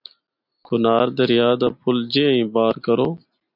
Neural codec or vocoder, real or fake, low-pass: none; real; 5.4 kHz